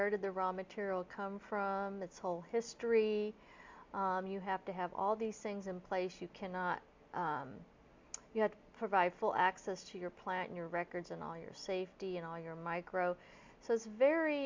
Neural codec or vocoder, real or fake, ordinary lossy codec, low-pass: none; real; MP3, 64 kbps; 7.2 kHz